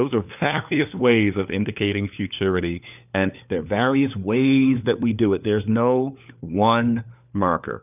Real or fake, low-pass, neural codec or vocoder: fake; 3.6 kHz; codec, 16 kHz, 4 kbps, FunCodec, trained on LibriTTS, 50 frames a second